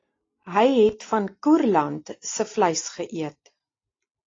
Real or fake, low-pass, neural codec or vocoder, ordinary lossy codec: real; 7.2 kHz; none; AAC, 32 kbps